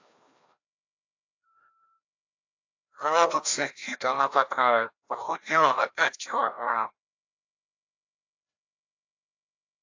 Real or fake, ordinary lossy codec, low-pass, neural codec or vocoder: fake; AAC, 48 kbps; 7.2 kHz; codec, 16 kHz, 1 kbps, FreqCodec, larger model